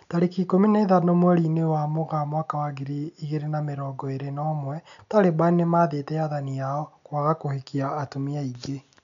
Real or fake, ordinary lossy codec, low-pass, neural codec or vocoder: real; none; 7.2 kHz; none